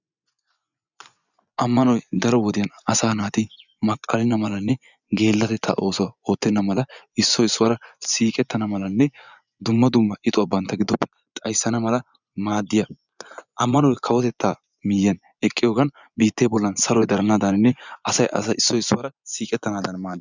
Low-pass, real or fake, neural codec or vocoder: 7.2 kHz; fake; vocoder, 24 kHz, 100 mel bands, Vocos